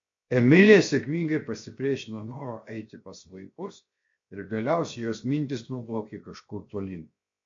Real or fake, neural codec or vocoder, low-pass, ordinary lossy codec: fake; codec, 16 kHz, 0.7 kbps, FocalCodec; 7.2 kHz; MP3, 48 kbps